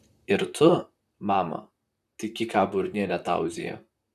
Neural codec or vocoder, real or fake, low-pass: vocoder, 44.1 kHz, 128 mel bands, Pupu-Vocoder; fake; 14.4 kHz